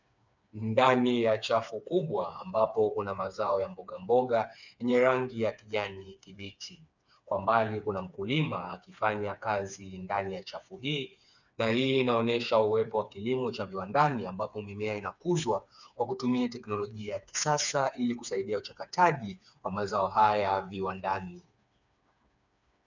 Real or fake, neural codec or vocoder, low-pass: fake; codec, 16 kHz, 4 kbps, FreqCodec, smaller model; 7.2 kHz